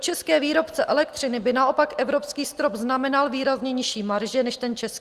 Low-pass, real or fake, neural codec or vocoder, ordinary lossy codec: 14.4 kHz; real; none; Opus, 24 kbps